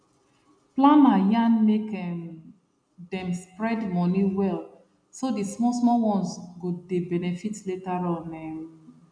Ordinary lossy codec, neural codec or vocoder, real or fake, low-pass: none; none; real; 9.9 kHz